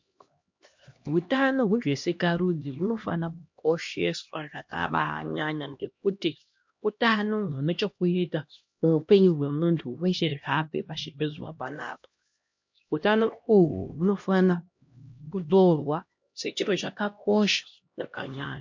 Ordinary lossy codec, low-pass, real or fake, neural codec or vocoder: MP3, 48 kbps; 7.2 kHz; fake; codec, 16 kHz, 1 kbps, X-Codec, HuBERT features, trained on LibriSpeech